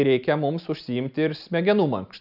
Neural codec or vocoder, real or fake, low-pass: none; real; 5.4 kHz